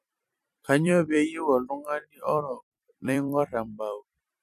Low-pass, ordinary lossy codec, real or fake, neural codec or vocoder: 14.4 kHz; none; fake; vocoder, 44.1 kHz, 128 mel bands every 256 samples, BigVGAN v2